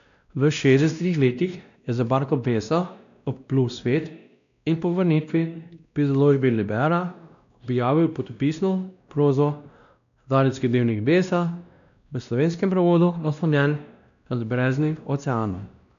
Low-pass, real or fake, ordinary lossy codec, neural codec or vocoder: 7.2 kHz; fake; none; codec, 16 kHz, 1 kbps, X-Codec, WavLM features, trained on Multilingual LibriSpeech